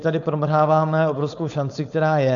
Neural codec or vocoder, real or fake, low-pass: codec, 16 kHz, 4.8 kbps, FACodec; fake; 7.2 kHz